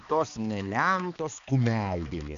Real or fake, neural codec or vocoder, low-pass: fake; codec, 16 kHz, 2 kbps, X-Codec, HuBERT features, trained on balanced general audio; 7.2 kHz